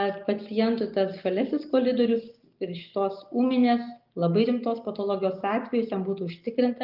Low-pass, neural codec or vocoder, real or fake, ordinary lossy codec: 5.4 kHz; none; real; Opus, 24 kbps